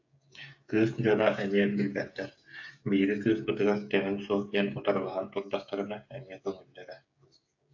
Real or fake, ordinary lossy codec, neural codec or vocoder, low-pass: fake; AAC, 48 kbps; codec, 16 kHz, 16 kbps, FreqCodec, smaller model; 7.2 kHz